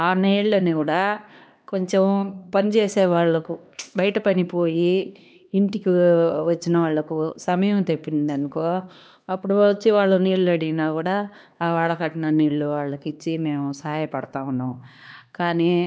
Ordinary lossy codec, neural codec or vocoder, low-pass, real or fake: none; codec, 16 kHz, 2 kbps, X-Codec, HuBERT features, trained on LibriSpeech; none; fake